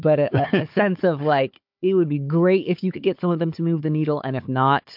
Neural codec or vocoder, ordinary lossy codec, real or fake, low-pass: codec, 16 kHz, 4 kbps, FunCodec, trained on Chinese and English, 50 frames a second; MP3, 48 kbps; fake; 5.4 kHz